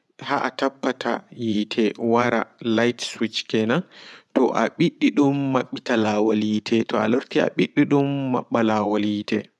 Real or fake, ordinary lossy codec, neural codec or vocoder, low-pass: fake; none; vocoder, 24 kHz, 100 mel bands, Vocos; none